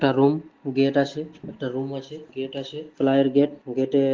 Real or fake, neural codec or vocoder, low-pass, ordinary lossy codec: real; none; 7.2 kHz; Opus, 16 kbps